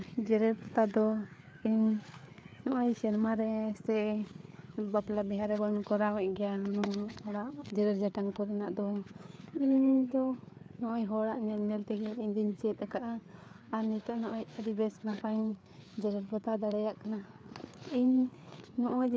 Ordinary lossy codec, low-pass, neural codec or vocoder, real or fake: none; none; codec, 16 kHz, 4 kbps, FreqCodec, larger model; fake